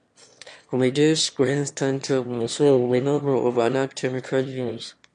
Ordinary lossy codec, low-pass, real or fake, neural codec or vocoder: MP3, 48 kbps; 9.9 kHz; fake; autoencoder, 22.05 kHz, a latent of 192 numbers a frame, VITS, trained on one speaker